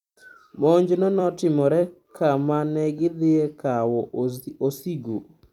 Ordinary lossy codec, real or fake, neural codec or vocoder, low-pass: none; fake; vocoder, 44.1 kHz, 128 mel bands every 256 samples, BigVGAN v2; 19.8 kHz